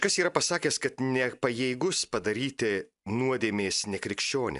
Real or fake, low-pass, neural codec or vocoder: real; 10.8 kHz; none